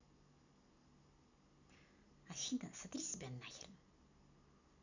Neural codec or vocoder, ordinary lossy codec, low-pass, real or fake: none; none; 7.2 kHz; real